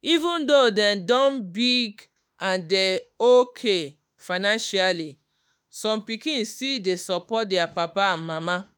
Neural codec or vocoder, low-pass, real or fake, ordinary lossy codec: autoencoder, 48 kHz, 32 numbers a frame, DAC-VAE, trained on Japanese speech; none; fake; none